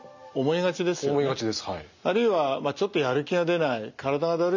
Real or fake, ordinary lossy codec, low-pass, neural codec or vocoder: real; none; 7.2 kHz; none